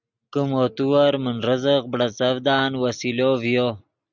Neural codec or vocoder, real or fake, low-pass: none; real; 7.2 kHz